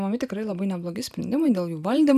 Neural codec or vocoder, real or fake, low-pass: none; real; 14.4 kHz